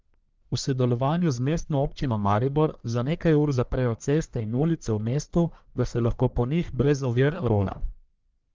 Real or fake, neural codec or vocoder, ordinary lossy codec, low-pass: fake; codec, 44.1 kHz, 1.7 kbps, Pupu-Codec; Opus, 24 kbps; 7.2 kHz